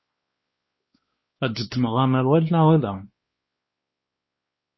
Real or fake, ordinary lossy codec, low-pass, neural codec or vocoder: fake; MP3, 24 kbps; 7.2 kHz; codec, 16 kHz, 2 kbps, X-Codec, WavLM features, trained on Multilingual LibriSpeech